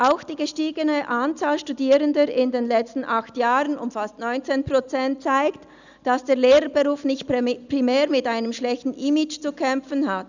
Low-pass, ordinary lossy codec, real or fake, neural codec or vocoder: 7.2 kHz; none; real; none